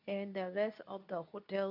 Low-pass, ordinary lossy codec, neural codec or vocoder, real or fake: 5.4 kHz; none; codec, 24 kHz, 0.9 kbps, WavTokenizer, medium speech release version 2; fake